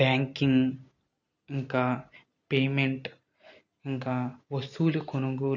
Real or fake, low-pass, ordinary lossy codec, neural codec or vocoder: real; 7.2 kHz; none; none